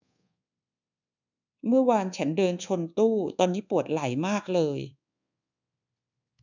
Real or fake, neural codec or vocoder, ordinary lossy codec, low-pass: fake; codec, 24 kHz, 1.2 kbps, DualCodec; none; 7.2 kHz